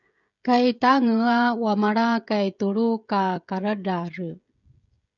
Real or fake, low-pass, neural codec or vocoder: fake; 7.2 kHz; codec, 16 kHz, 16 kbps, FreqCodec, smaller model